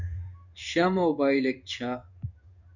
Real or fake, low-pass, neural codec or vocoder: fake; 7.2 kHz; codec, 16 kHz in and 24 kHz out, 1 kbps, XY-Tokenizer